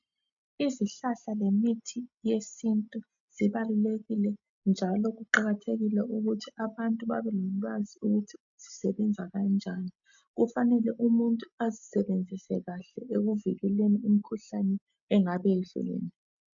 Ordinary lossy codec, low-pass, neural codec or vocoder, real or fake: MP3, 96 kbps; 7.2 kHz; none; real